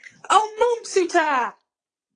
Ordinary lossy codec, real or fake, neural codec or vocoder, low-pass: AAC, 48 kbps; fake; vocoder, 22.05 kHz, 80 mel bands, WaveNeXt; 9.9 kHz